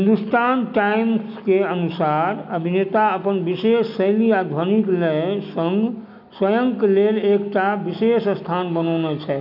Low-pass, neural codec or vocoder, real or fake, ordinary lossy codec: 5.4 kHz; none; real; none